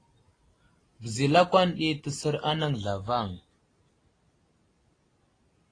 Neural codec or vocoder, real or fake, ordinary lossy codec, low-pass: none; real; AAC, 32 kbps; 9.9 kHz